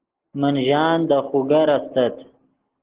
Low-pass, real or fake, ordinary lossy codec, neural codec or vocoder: 3.6 kHz; real; Opus, 16 kbps; none